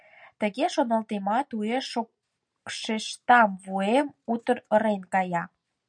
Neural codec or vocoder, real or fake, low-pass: none; real; 9.9 kHz